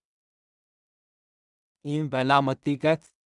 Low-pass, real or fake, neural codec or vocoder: 10.8 kHz; fake; codec, 16 kHz in and 24 kHz out, 0.4 kbps, LongCat-Audio-Codec, two codebook decoder